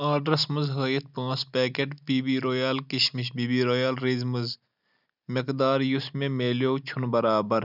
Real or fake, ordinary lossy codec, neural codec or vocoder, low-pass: real; none; none; 5.4 kHz